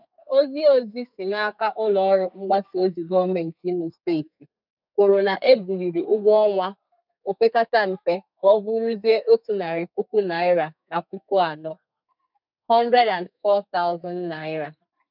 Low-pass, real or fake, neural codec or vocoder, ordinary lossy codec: 5.4 kHz; fake; codec, 32 kHz, 1.9 kbps, SNAC; none